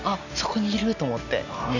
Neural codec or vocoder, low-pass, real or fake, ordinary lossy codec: none; 7.2 kHz; real; none